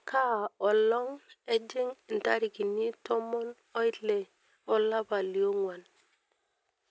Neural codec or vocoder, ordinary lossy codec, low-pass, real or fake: none; none; none; real